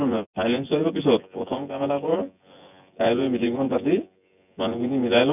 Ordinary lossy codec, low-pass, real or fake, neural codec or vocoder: none; 3.6 kHz; fake; vocoder, 24 kHz, 100 mel bands, Vocos